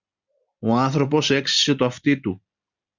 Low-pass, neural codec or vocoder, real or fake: 7.2 kHz; none; real